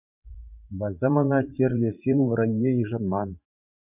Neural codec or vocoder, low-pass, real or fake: vocoder, 44.1 kHz, 80 mel bands, Vocos; 3.6 kHz; fake